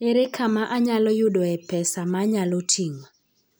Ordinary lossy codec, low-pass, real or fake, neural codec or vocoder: none; none; real; none